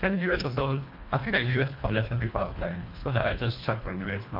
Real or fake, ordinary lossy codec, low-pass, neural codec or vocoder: fake; MP3, 48 kbps; 5.4 kHz; codec, 24 kHz, 1.5 kbps, HILCodec